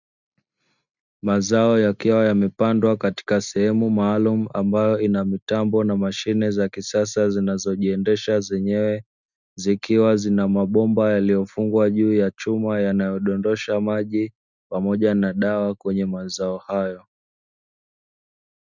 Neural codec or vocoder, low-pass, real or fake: none; 7.2 kHz; real